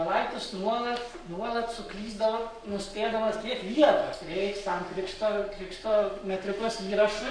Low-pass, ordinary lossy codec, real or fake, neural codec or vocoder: 9.9 kHz; MP3, 96 kbps; fake; codec, 44.1 kHz, 7.8 kbps, Pupu-Codec